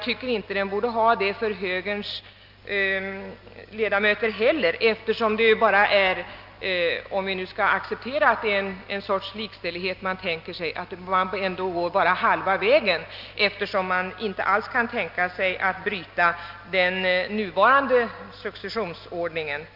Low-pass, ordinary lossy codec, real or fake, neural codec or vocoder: 5.4 kHz; Opus, 24 kbps; real; none